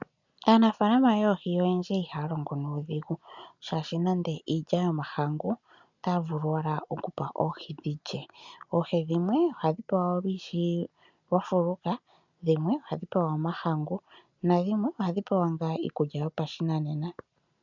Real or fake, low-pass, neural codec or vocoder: real; 7.2 kHz; none